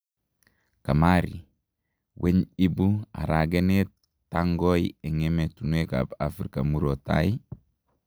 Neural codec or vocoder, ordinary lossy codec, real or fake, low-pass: none; none; real; none